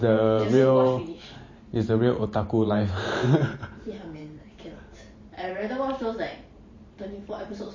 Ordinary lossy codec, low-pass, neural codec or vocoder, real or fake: MP3, 32 kbps; 7.2 kHz; vocoder, 44.1 kHz, 128 mel bands every 512 samples, BigVGAN v2; fake